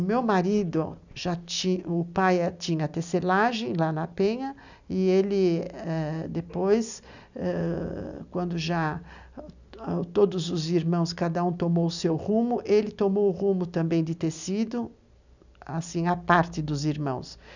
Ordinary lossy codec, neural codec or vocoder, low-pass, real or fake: none; none; 7.2 kHz; real